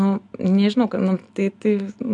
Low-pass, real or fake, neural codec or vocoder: 10.8 kHz; real; none